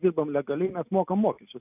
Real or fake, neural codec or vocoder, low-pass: real; none; 3.6 kHz